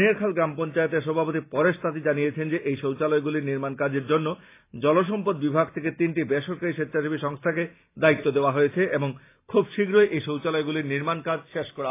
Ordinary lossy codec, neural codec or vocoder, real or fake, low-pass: AAC, 24 kbps; none; real; 3.6 kHz